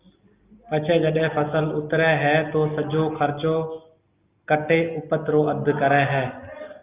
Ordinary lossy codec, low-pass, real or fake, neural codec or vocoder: Opus, 24 kbps; 3.6 kHz; real; none